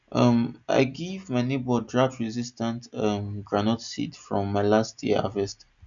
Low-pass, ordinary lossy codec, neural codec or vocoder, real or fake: 7.2 kHz; none; none; real